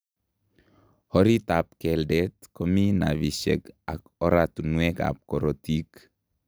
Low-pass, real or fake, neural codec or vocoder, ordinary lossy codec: none; real; none; none